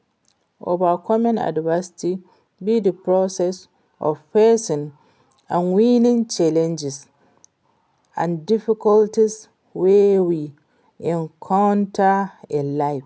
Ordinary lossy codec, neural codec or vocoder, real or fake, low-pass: none; none; real; none